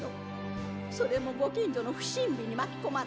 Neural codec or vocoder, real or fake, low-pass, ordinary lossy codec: none; real; none; none